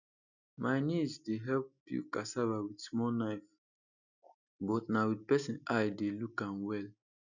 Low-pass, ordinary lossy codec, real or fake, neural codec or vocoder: 7.2 kHz; none; real; none